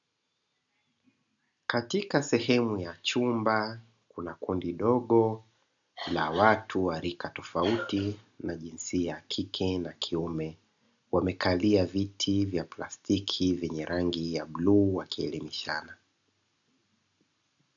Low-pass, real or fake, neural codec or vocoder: 7.2 kHz; real; none